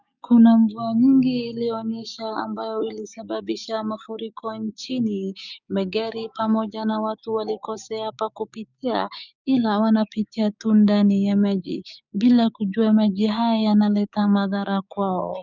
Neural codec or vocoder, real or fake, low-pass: codec, 16 kHz, 6 kbps, DAC; fake; 7.2 kHz